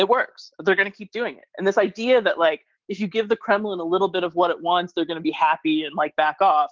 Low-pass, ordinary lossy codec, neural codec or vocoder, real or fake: 7.2 kHz; Opus, 16 kbps; none; real